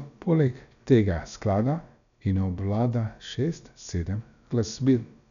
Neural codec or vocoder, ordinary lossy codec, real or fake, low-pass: codec, 16 kHz, about 1 kbps, DyCAST, with the encoder's durations; none; fake; 7.2 kHz